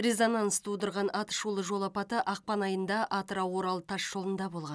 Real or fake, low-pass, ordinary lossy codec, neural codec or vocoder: real; none; none; none